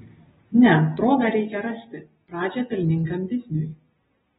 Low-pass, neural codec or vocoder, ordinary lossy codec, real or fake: 7.2 kHz; none; AAC, 16 kbps; real